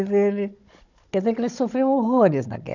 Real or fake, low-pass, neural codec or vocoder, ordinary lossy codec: fake; 7.2 kHz; codec, 16 kHz, 16 kbps, FunCodec, trained on Chinese and English, 50 frames a second; none